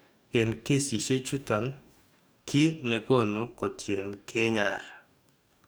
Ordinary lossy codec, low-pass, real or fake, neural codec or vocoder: none; none; fake; codec, 44.1 kHz, 2.6 kbps, DAC